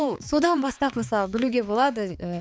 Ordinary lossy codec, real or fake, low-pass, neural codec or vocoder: none; fake; none; codec, 16 kHz, 4 kbps, X-Codec, HuBERT features, trained on balanced general audio